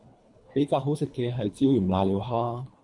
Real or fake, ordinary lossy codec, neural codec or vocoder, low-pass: fake; MP3, 64 kbps; codec, 24 kHz, 3 kbps, HILCodec; 10.8 kHz